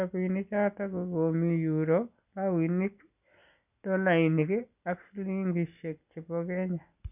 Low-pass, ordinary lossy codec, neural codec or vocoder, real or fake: 3.6 kHz; none; none; real